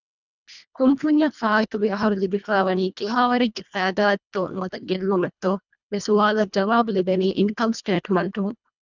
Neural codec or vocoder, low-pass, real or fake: codec, 24 kHz, 1.5 kbps, HILCodec; 7.2 kHz; fake